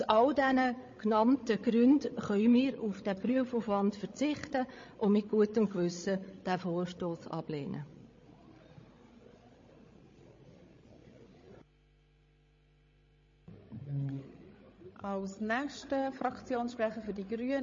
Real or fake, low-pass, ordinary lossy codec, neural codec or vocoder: fake; 7.2 kHz; MP3, 32 kbps; codec, 16 kHz, 16 kbps, FreqCodec, larger model